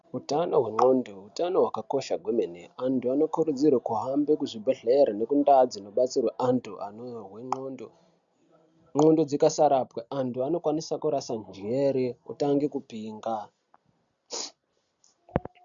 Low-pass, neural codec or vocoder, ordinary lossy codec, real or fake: 7.2 kHz; none; MP3, 96 kbps; real